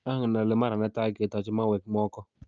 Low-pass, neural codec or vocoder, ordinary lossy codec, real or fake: 7.2 kHz; none; Opus, 24 kbps; real